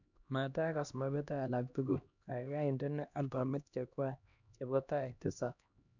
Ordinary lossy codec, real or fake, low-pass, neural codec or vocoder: none; fake; 7.2 kHz; codec, 16 kHz, 1 kbps, X-Codec, HuBERT features, trained on LibriSpeech